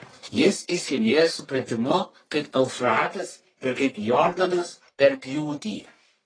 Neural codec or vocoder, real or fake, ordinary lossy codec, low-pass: codec, 44.1 kHz, 1.7 kbps, Pupu-Codec; fake; AAC, 32 kbps; 9.9 kHz